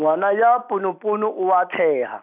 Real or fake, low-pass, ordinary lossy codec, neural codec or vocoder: real; 3.6 kHz; none; none